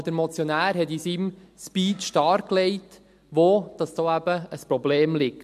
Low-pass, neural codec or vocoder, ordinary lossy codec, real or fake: 14.4 kHz; none; MP3, 64 kbps; real